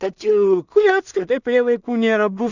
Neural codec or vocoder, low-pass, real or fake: codec, 16 kHz in and 24 kHz out, 0.4 kbps, LongCat-Audio-Codec, two codebook decoder; 7.2 kHz; fake